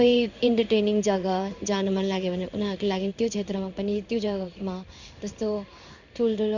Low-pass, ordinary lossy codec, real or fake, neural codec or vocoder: 7.2 kHz; none; fake; codec, 16 kHz in and 24 kHz out, 1 kbps, XY-Tokenizer